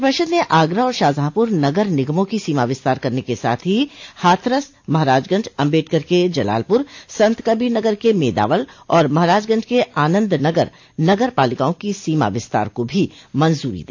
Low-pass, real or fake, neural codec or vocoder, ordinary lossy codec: 7.2 kHz; real; none; AAC, 48 kbps